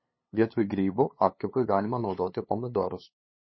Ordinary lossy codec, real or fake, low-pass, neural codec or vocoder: MP3, 24 kbps; fake; 7.2 kHz; codec, 16 kHz, 2 kbps, FunCodec, trained on LibriTTS, 25 frames a second